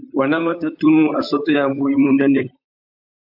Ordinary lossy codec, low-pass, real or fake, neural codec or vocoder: AAC, 48 kbps; 5.4 kHz; fake; vocoder, 44.1 kHz, 128 mel bands, Pupu-Vocoder